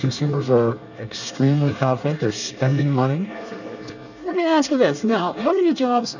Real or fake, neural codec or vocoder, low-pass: fake; codec, 24 kHz, 1 kbps, SNAC; 7.2 kHz